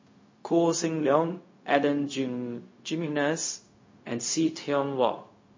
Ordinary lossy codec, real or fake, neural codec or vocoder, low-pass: MP3, 32 kbps; fake; codec, 16 kHz, 0.4 kbps, LongCat-Audio-Codec; 7.2 kHz